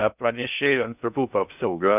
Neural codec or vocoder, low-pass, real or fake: codec, 16 kHz in and 24 kHz out, 0.6 kbps, FocalCodec, streaming, 2048 codes; 3.6 kHz; fake